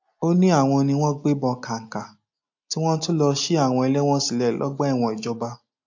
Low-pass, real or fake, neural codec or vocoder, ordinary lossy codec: 7.2 kHz; real; none; AAC, 48 kbps